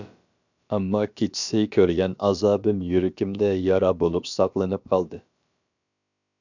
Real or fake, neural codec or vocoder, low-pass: fake; codec, 16 kHz, about 1 kbps, DyCAST, with the encoder's durations; 7.2 kHz